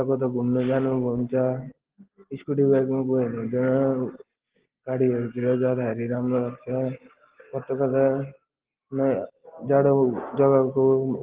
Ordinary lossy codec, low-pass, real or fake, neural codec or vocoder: Opus, 16 kbps; 3.6 kHz; real; none